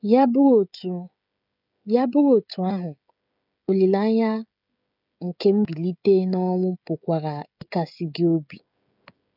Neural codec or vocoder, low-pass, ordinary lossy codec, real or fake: codec, 16 kHz, 16 kbps, FreqCodec, smaller model; 5.4 kHz; none; fake